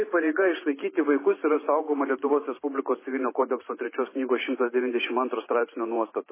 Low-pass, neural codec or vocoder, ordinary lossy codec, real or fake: 3.6 kHz; vocoder, 44.1 kHz, 128 mel bands every 512 samples, BigVGAN v2; MP3, 16 kbps; fake